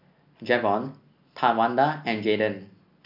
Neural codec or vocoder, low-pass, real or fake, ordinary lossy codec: none; 5.4 kHz; real; none